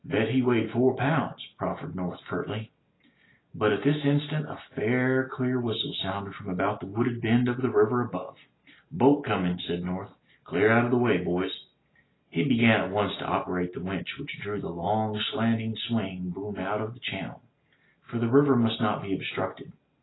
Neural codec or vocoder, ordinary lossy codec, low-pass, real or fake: none; AAC, 16 kbps; 7.2 kHz; real